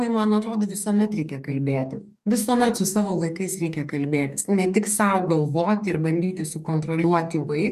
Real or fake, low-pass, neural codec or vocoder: fake; 14.4 kHz; codec, 44.1 kHz, 2.6 kbps, DAC